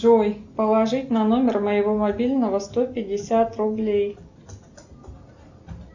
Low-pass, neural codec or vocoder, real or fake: 7.2 kHz; none; real